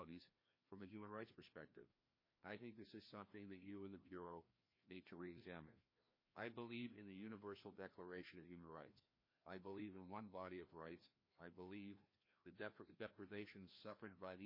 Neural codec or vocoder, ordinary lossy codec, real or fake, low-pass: codec, 16 kHz, 1 kbps, FreqCodec, larger model; MP3, 24 kbps; fake; 5.4 kHz